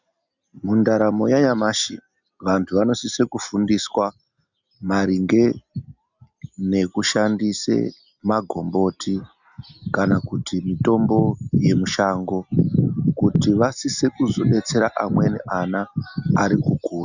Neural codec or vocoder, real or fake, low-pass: none; real; 7.2 kHz